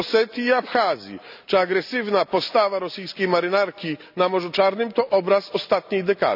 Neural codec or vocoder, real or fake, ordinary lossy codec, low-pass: none; real; none; 5.4 kHz